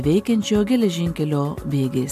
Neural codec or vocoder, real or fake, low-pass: none; real; 14.4 kHz